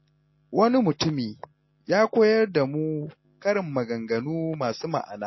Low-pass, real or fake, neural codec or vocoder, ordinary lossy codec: 7.2 kHz; real; none; MP3, 24 kbps